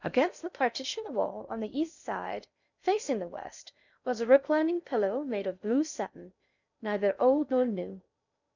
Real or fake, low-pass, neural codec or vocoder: fake; 7.2 kHz; codec, 16 kHz in and 24 kHz out, 0.6 kbps, FocalCodec, streaming, 2048 codes